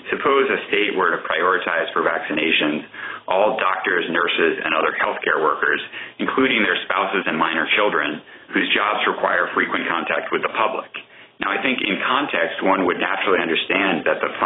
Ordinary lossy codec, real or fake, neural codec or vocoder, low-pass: AAC, 16 kbps; fake; vocoder, 44.1 kHz, 128 mel bands every 512 samples, BigVGAN v2; 7.2 kHz